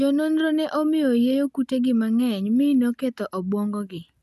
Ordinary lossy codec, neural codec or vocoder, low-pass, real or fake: none; none; 14.4 kHz; real